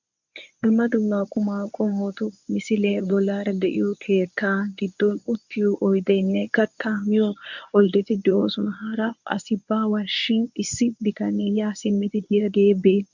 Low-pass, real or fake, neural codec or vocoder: 7.2 kHz; fake; codec, 24 kHz, 0.9 kbps, WavTokenizer, medium speech release version 1